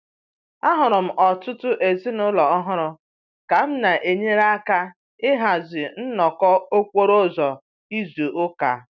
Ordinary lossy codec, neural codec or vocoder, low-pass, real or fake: none; none; 7.2 kHz; real